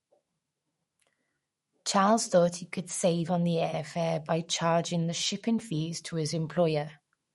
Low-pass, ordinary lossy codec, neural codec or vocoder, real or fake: 19.8 kHz; MP3, 48 kbps; autoencoder, 48 kHz, 128 numbers a frame, DAC-VAE, trained on Japanese speech; fake